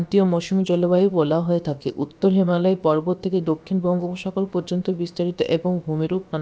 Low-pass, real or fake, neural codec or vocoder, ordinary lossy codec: none; fake; codec, 16 kHz, about 1 kbps, DyCAST, with the encoder's durations; none